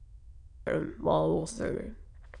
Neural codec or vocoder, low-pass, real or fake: autoencoder, 22.05 kHz, a latent of 192 numbers a frame, VITS, trained on many speakers; 9.9 kHz; fake